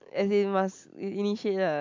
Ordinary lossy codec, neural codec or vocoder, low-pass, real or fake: MP3, 64 kbps; none; 7.2 kHz; real